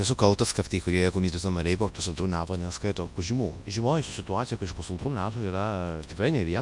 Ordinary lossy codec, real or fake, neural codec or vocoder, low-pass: MP3, 64 kbps; fake; codec, 24 kHz, 0.9 kbps, WavTokenizer, large speech release; 10.8 kHz